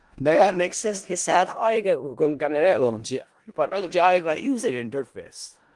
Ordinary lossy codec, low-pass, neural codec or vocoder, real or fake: Opus, 24 kbps; 10.8 kHz; codec, 16 kHz in and 24 kHz out, 0.4 kbps, LongCat-Audio-Codec, four codebook decoder; fake